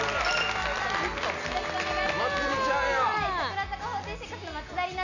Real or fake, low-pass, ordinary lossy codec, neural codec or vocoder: real; 7.2 kHz; none; none